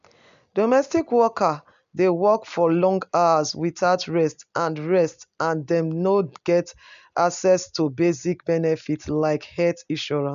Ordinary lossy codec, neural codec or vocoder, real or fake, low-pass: none; none; real; 7.2 kHz